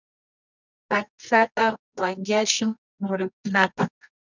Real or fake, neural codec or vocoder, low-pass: fake; codec, 24 kHz, 0.9 kbps, WavTokenizer, medium music audio release; 7.2 kHz